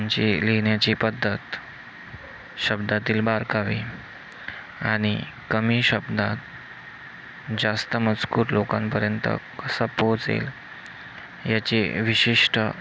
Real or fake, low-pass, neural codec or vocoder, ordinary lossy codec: real; none; none; none